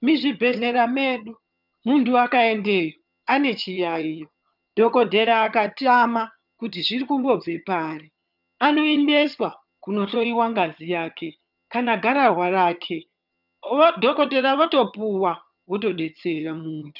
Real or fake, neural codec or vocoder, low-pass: fake; vocoder, 22.05 kHz, 80 mel bands, HiFi-GAN; 5.4 kHz